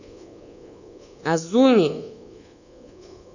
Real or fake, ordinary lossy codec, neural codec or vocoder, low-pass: fake; none; codec, 24 kHz, 1.2 kbps, DualCodec; 7.2 kHz